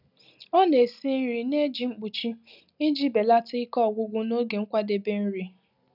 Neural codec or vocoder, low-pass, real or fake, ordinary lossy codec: none; 5.4 kHz; real; none